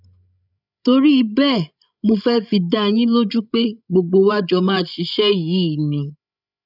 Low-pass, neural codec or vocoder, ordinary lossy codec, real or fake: 5.4 kHz; codec, 16 kHz, 16 kbps, FreqCodec, larger model; none; fake